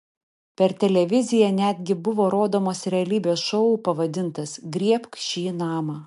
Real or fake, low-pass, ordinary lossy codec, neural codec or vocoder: real; 10.8 kHz; MP3, 64 kbps; none